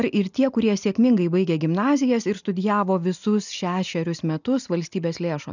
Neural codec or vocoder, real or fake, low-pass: none; real; 7.2 kHz